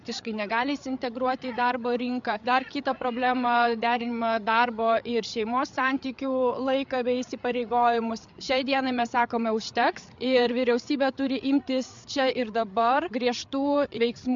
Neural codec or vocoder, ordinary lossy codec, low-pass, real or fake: codec, 16 kHz, 8 kbps, FreqCodec, larger model; MP3, 64 kbps; 7.2 kHz; fake